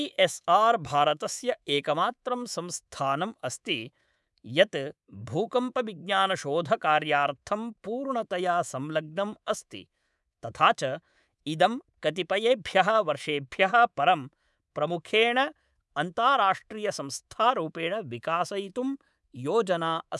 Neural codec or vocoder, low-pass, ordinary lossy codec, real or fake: codec, 24 kHz, 3.1 kbps, DualCodec; none; none; fake